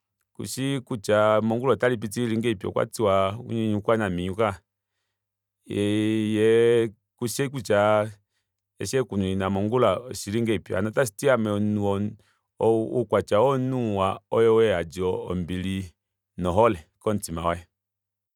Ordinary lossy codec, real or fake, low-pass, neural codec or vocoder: none; fake; 19.8 kHz; vocoder, 44.1 kHz, 128 mel bands every 512 samples, BigVGAN v2